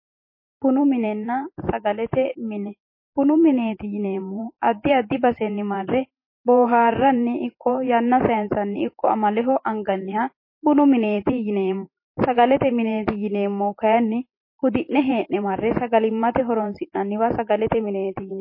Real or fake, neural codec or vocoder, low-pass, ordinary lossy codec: fake; vocoder, 44.1 kHz, 128 mel bands every 512 samples, BigVGAN v2; 5.4 kHz; MP3, 24 kbps